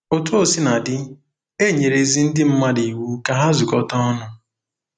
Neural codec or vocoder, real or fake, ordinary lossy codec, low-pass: none; real; none; 9.9 kHz